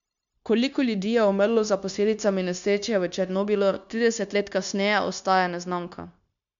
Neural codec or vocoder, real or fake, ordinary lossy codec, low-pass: codec, 16 kHz, 0.9 kbps, LongCat-Audio-Codec; fake; none; 7.2 kHz